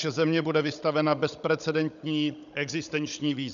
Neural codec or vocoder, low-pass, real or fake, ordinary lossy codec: codec, 16 kHz, 16 kbps, FunCodec, trained on Chinese and English, 50 frames a second; 7.2 kHz; fake; MP3, 96 kbps